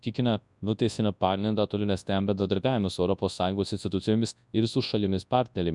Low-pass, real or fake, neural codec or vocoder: 10.8 kHz; fake; codec, 24 kHz, 0.9 kbps, WavTokenizer, large speech release